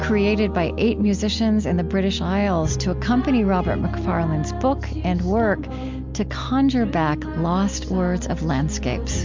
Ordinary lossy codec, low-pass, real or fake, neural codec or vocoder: MP3, 64 kbps; 7.2 kHz; real; none